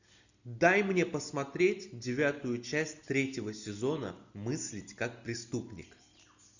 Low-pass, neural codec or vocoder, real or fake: 7.2 kHz; none; real